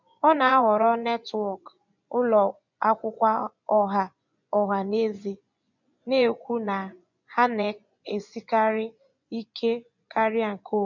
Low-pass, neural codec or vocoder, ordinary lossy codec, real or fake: 7.2 kHz; vocoder, 24 kHz, 100 mel bands, Vocos; none; fake